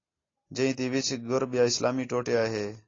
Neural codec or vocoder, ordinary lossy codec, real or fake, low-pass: none; AAC, 32 kbps; real; 7.2 kHz